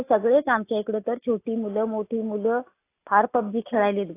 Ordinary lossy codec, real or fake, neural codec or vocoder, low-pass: AAC, 24 kbps; real; none; 3.6 kHz